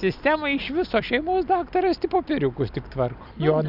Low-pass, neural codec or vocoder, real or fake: 5.4 kHz; none; real